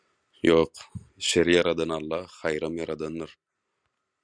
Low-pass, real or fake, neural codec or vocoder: 9.9 kHz; real; none